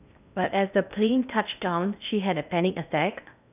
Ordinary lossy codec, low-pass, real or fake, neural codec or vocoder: none; 3.6 kHz; fake; codec, 16 kHz in and 24 kHz out, 0.8 kbps, FocalCodec, streaming, 65536 codes